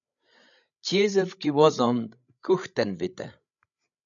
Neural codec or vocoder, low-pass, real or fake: codec, 16 kHz, 16 kbps, FreqCodec, larger model; 7.2 kHz; fake